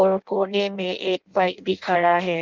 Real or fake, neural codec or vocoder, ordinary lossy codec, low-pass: fake; codec, 16 kHz in and 24 kHz out, 0.6 kbps, FireRedTTS-2 codec; Opus, 24 kbps; 7.2 kHz